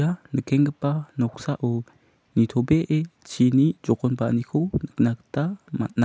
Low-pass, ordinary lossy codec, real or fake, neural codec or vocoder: none; none; real; none